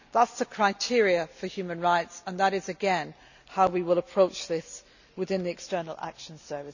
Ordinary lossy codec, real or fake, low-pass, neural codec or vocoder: none; real; 7.2 kHz; none